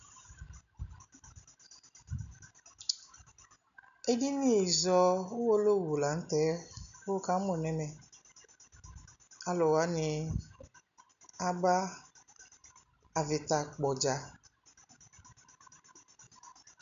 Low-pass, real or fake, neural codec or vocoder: 7.2 kHz; real; none